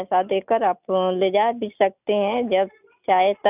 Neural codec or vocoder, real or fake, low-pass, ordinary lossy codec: none; real; 3.6 kHz; none